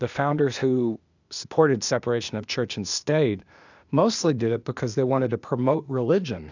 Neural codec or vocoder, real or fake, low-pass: codec, 16 kHz, 0.8 kbps, ZipCodec; fake; 7.2 kHz